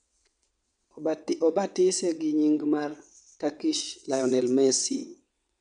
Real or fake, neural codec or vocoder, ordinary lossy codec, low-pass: fake; vocoder, 22.05 kHz, 80 mel bands, WaveNeXt; none; 9.9 kHz